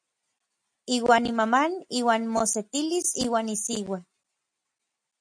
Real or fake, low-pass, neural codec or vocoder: real; 9.9 kHz; none